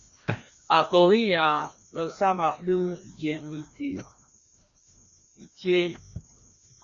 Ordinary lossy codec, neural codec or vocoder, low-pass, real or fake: Opus, 64 kbps; codec, 16 kHz, 1 kbps, FreqCodec, larger model; 7.2 kHz; fake